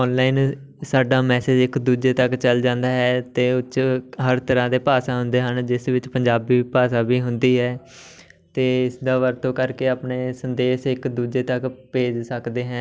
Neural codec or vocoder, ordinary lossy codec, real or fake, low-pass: none; none; real; none